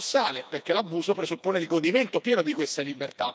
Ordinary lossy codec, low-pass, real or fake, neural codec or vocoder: none; none; fake; codec, 16 kHz, 2 kbps, FreqCodec, smaller model